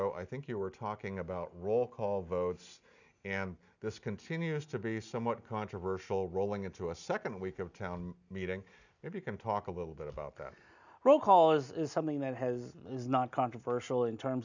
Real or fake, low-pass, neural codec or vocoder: real; 7.2 kHz; none